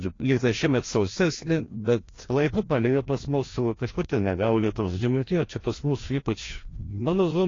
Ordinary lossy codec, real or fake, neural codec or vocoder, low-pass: AAC, 32 kbps; fake; codec, 16 kHz, 1 kbps, FreqCodec, larger model; 7.2 kHz